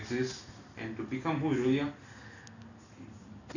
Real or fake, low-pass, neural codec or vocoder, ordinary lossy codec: real; 7.2 kHz; none; none